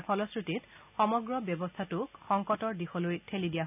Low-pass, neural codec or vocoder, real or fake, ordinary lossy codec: 3.6 kHz; none; real; none